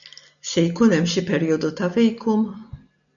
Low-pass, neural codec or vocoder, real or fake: 7.2 kHz; none; real